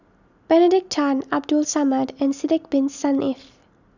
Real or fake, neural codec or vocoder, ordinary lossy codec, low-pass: real; none; none; 7.2 kHz